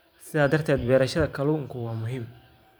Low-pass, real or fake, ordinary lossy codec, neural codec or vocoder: none; fake; none; vocoder, 44.1 kHz, 128 mel bands every 512 samples, BigVGAN v2